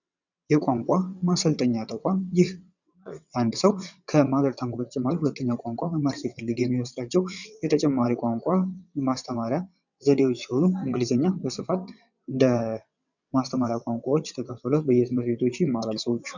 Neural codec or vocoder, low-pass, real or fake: vocoder, 22.05 kHz, 80 mel bands, WaveNeXt; 7.2 kHz; fake